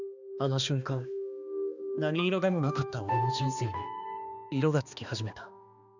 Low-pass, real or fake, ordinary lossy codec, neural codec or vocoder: 7.2 kHz; fake; none; codec, 16 kHz, 2 kbps, X-Codec, HuBERT features, trained on balanced general audio